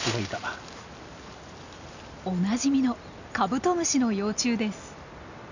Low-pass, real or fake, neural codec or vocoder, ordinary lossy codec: 7.2 kHz; real; none; none